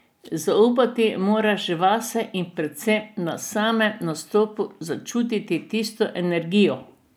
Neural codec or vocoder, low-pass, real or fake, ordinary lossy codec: none; none; real; none